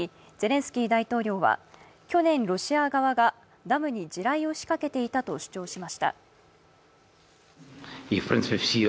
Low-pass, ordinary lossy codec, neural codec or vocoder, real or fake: none; none; none; real